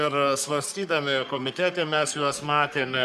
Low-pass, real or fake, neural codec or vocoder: 14.4 kHz; fake; codec, 44.1 kHz, 3.4 kbps, Pupu-Codec